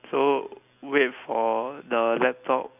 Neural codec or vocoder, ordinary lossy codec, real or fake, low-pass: none; none; real; 3.6 kHz